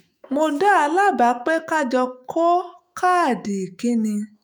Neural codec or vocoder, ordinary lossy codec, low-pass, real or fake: autoencoder, 48 kHz, 128 numbers a frame, DAC-VAE, trained on Japanese speech; none; none; fake